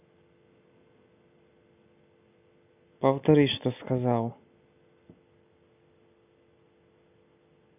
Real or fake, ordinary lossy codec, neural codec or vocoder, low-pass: real; none; none; 3.6 kHz